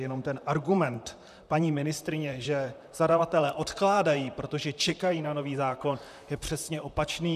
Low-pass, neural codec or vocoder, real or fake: 14.4 kHz; vocoder, 44.1 kHz, 128 mel bands, Pupu-Vocoder; fake